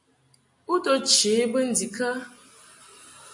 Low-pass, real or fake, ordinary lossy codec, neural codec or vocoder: 10.8 kHz; real; MP3, 48 kbps; none